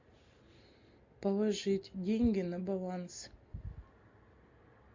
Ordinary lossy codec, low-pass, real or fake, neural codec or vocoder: MP3, 48 kbps; 7.2 kHz; real; none